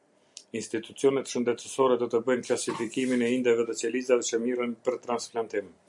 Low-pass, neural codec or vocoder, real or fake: 10.8 kHz; none; real